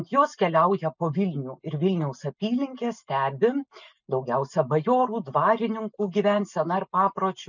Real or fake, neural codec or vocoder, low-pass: real; none; 7.2 kHz